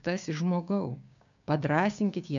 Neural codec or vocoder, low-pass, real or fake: codec, 16 kHz, 6 kbps, DAC; 7.2 kHz; fake